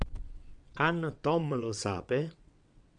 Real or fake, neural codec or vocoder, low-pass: fake; vocoder, 22.05 kHz, 80 mel bands, WaveNeXt; 9.9 kHz